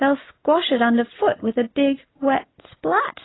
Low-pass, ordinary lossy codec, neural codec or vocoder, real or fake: 7.2 kHz; AAC, 16 kbps; none; real